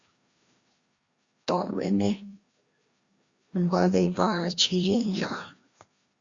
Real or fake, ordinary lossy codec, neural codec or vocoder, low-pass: fake; Opus, 64 kbps; codec, 16 kHz, 1 kbps, FreqCodec, larger model; 7.2 kHz